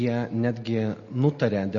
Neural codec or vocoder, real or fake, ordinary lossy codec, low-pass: none; real; MP3, 32 kbps; 7.2 kHz